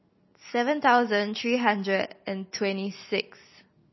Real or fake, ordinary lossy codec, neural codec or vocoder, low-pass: real; MP3, 24 kbps; none; 7.2 kHz